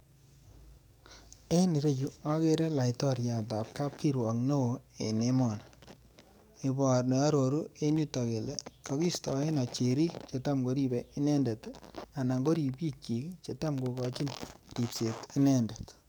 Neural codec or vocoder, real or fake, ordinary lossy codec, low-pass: codec, 44.1 kHz, 7.8 kbps, DAC; fake; none; none